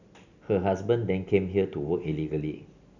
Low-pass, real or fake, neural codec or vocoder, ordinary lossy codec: 7.2 kHz; real; none; none